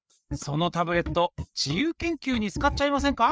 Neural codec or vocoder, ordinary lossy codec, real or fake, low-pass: codec, 16 kHz, 4 kbps, FreqCodec, larger model; none; fake; none